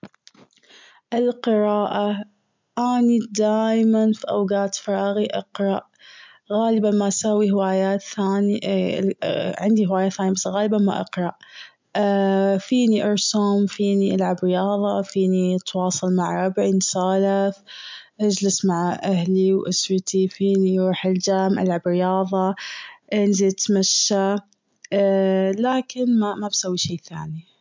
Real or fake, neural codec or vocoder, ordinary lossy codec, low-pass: real; none; MP3, 64 kbps; 7.2 kHz